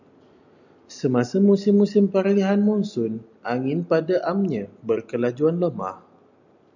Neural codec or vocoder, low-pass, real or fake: none; 7.2 kHz; real